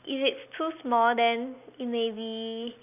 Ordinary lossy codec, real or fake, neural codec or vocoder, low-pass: none; real; none; 3.6 kHz